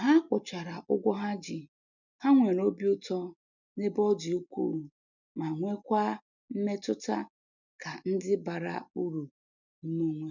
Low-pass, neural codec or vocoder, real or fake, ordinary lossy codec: 7.2 kHz; none; real; none